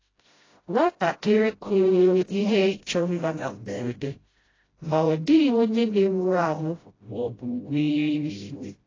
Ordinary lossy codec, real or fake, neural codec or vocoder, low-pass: AAC, 32 kbps; fake; codec, 16 kHz, 0.5 kbps, FreqCodec, smaller model; 7.2 kHz